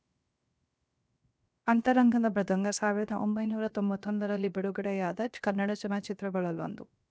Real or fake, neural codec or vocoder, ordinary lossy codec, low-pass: fake; codec, 16 kHz, 0.7 kbps, FocalCodec; none; none